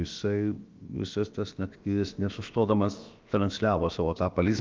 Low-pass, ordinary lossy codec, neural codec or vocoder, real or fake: 7.2 kHz; Opus, 32 kbps; codec, 16 kHz, about 1 kbps, DyCAST, with the encoder's durations; fake